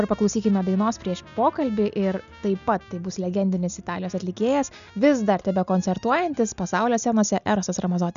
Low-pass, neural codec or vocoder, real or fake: 7.2 kHz; none; real